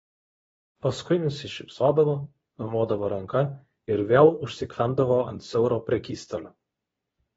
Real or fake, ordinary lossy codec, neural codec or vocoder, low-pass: fake; AAC, 24 kbps; codec, 24 kHz, 0.9 kbps, WavTokenizer, medium speech release version 2; 10.8 kHz